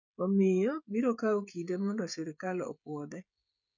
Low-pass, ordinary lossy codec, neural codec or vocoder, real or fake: 7.2 kHz; none; codec, 16 kHz, 16 kbps, FreqCodec, smaller model; fake